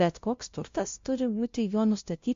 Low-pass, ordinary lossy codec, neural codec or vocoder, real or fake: 7.2 kHz; MP3, 48 kbps; codec, 16 kHz, 0.5 kbps, FunCodec, trained on LibriTTS, 25 frames a second; fake